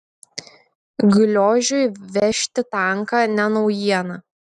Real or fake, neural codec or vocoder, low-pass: real; none; 10.8 kHz